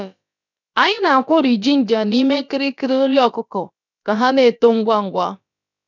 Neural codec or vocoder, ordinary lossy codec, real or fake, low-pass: codec, 16 kHz, about 1 kbps, DyCAST, with the encoder's durations; none; fake; 7.2 kHz